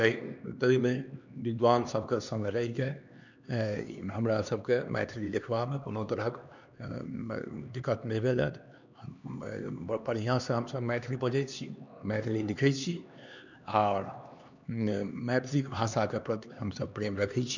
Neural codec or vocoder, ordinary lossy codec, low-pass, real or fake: codec, 16 kHz, 2 kbps, X-Codec, HuBERT features, trained on LibriSpeech; none; 7.2 kHz; fake